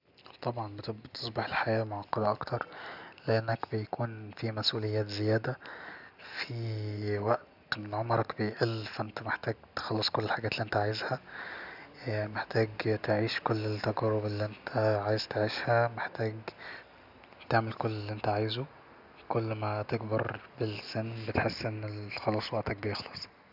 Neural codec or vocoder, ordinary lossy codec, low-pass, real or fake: none; none; 5.4 kHz; real